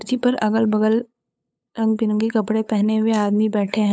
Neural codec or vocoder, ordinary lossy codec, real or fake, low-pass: codec, 16 kHz, 8 kbps, FunCodec, trained on LibriTTS, 25 frames a second; none; fake; none